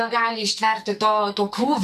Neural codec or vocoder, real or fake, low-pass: codec, 44.1 kHz, 2.6 kbps, SNAC; fake; 14.4 kHz